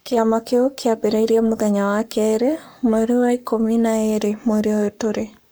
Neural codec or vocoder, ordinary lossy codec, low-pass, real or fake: codec, 44.1 kHz, 7.8 kbps, Pupu-Codec; none; none; fake